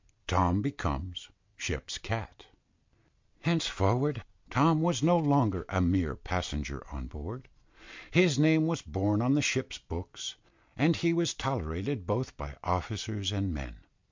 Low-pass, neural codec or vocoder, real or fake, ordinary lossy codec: 7.2 kHz; none; real; MP3, 64 kbps